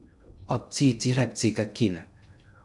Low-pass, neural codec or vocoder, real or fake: 10.8 kHz; codec, 16 kHz in and 24 kHz out, 0.6 kbps, FocalCodec, streaming, 2048 codes; fake